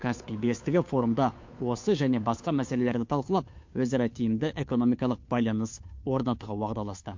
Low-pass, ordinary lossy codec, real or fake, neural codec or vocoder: 7.2 kHz; MP3, 48 kbps; fake; codec, 16 kHz, 2 kbps, FunCodec, trained on Chinese and English, 25 frames a second